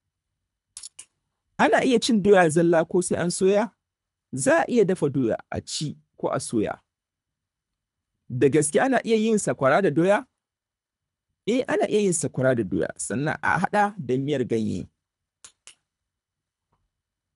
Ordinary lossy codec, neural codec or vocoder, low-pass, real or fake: none; codec, 24 kHz, 3 kbps, HILCodec; 10.8 kHz; fake